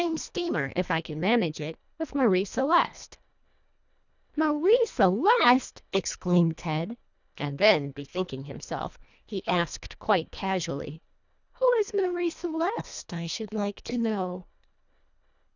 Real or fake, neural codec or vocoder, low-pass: fake; codec, 24 kHz, 1.5 kbps, HILCodec; 7.2 kHz